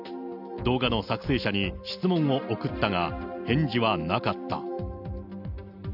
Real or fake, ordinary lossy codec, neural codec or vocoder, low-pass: real; none; none; 5.4 kHz